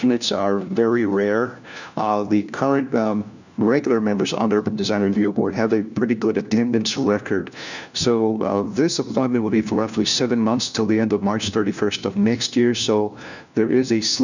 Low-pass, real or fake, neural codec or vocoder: 7.2 kHz; fake; codec, 16 kHz, 1 kbps, FunCodec, trained on LibriTTS, 50 frames a second